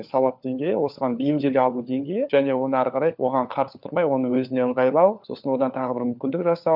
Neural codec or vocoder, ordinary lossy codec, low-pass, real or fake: codec, 16 kHz, 4 kbps, FunCodec, trained on LibriTTS, 50 frames a second; none; 5.4 kHz; fake